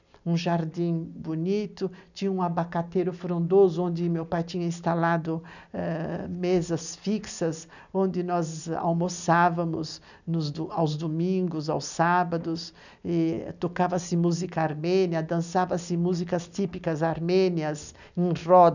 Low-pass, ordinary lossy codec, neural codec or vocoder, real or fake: 7.2 kHz; none; none; real